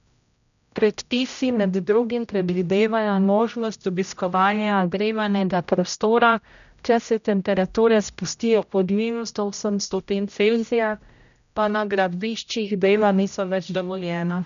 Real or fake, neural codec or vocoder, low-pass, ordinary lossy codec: fake; codec, 16 kHz, 0.5 kbps, X-Codec, HuBERT features, trained on general audio; 7.2 kHz; none